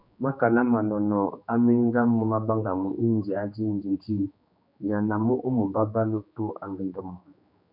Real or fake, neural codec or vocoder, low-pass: fake; codec, 16 kHz, 2 kbps, X-Codec, HuBERT features, trained on general audio; 5.4 kHz